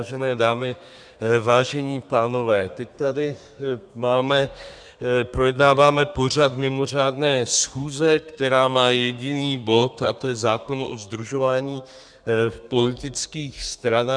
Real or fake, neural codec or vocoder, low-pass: fake; codec, 32 kHz, 1.9 kbps, SNAC; 9.9 kHz